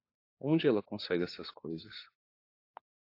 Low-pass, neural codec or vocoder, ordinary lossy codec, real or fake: 5.4 kHz; codec, 16 kHz, 4 kbps, FunCodec, trained on LibriTTS, 50 frames a second; AAC, 32 kbps; fake